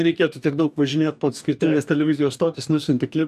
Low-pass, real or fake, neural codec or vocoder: 14.4 kHz; fake; codec, 44.1 kHz, 2.6 kbps, DAC